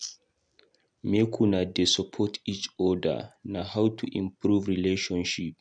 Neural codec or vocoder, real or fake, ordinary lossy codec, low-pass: none; real; none; 9.9 kHz